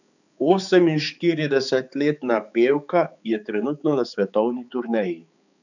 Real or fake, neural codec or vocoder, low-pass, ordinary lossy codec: fake; codec, 16 kHz, 4 kbps, X-Codec, HuBERT features, trained on balanced general audio; 7.2 kHz; none